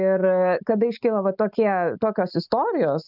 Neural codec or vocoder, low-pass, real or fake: none; 5.4 kHz; real